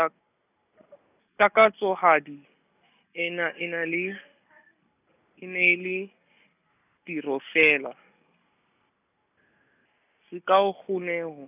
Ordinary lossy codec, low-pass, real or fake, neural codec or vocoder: none; 3.6 kHz; real; none